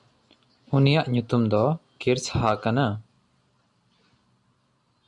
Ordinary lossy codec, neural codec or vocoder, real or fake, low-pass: MP3, 96 kbps; none; real; 10.8 kHz